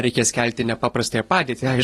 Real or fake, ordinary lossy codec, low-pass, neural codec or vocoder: real; AAC, 32 kbps; 19.8 kHz; none